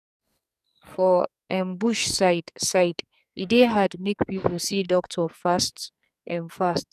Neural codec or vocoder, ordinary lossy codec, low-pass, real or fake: codec, 44.1 kHz, 2.6 kbps, SNAC; none; 14.4 kHz; fake